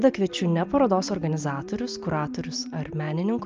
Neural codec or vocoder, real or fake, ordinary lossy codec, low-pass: none; real; Opus, 24 kbps; 7.2 kHz